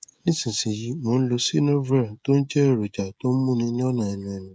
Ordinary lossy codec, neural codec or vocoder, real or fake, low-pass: none; codec, 16 kHz, 16 kbps, FreqCodec, smaller model; fake; none